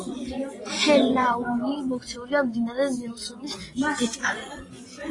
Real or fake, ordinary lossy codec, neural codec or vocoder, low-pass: fake; AAC, 32 kbps; vocoder, 24 kHz, 100 mel bands, Vocos; 10.8 kHz